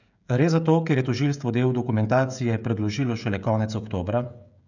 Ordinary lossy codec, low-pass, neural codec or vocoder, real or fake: none; 7.2 kHz; codec, 16 kHz, 16 kbps, FreqCodec, smaller model; fake